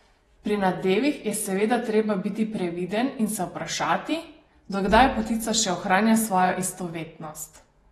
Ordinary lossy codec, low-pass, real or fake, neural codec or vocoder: AAC, 32 kbps; 19.8 kHz; fake; vocoder, 48 kHz, 128 mel bands, Vocos